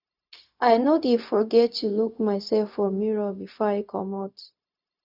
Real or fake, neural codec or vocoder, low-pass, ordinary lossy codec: fake; codec, 16 kHz, 0.4 kbps, LongCat-Audio-Codec; 5.4 kHz; none